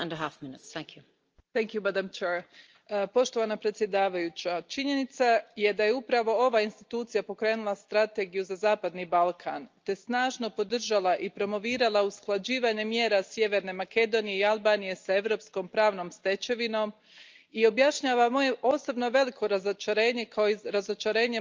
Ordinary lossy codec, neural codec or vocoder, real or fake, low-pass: Opus, 24 kbps; none; real; 7.2 kHz